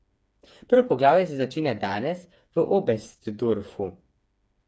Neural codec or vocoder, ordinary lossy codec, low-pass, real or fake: codec, 16 kHz, 4 kbps, FreqCodec, smaller model; none; none; fake